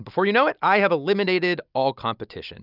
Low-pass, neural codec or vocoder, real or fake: 5.4 kHz; none; real